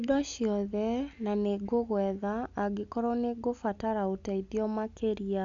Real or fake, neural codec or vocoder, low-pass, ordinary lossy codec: real; none; 7.2 kHz; AAC, 64 kbps